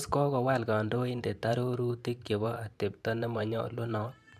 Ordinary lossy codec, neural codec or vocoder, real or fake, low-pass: MP3, 96 kbps; vocoder, 44.1 kHz, 128 mel bands every 512 samples, BigVGAN v2; fake; 14.4 kHz